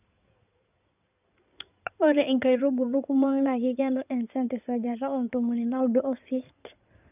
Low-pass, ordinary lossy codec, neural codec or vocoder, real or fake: 3.6 kHz; none; codec, 16 kHz in and 24 kHz out, 2.2 kbps, FireRedTTS-2 codec; fake